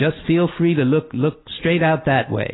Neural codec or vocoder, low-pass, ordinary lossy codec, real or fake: none; 7.2 kHz; AAC, 16 kbps; real